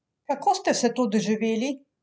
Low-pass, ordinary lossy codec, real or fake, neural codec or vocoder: none; none; real; none